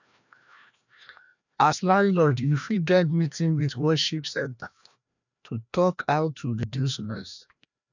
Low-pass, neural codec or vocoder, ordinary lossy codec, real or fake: 7.2 kHz; codec, 16 kHz, 1 kbps, FreqCodec, larger model; none; fake